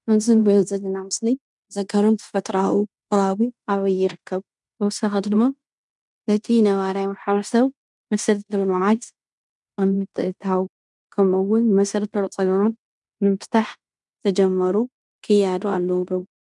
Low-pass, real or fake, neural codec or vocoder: 10.8 kHz; fake; codec, 16 kHz in and 24 kHz out, 0.9 kbps, LongCat-Audio-Codec, fine tuned four codebook decoder